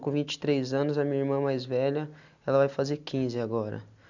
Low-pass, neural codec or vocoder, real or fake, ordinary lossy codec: 7.2 kHz; none; real; none